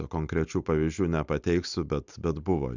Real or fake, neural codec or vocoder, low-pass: real; none; 7.2 kHz